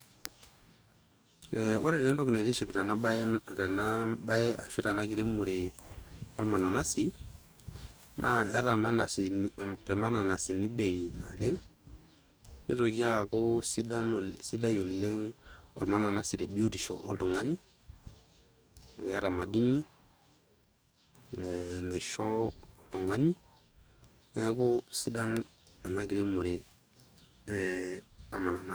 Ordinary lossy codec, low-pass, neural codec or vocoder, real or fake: none; none; codec, 44.1 kHz, 2.6 kbps, DAC; fake